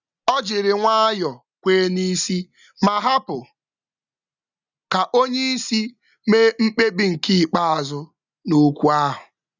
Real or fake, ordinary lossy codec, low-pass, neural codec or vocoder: real; none; 7.2 kHz; none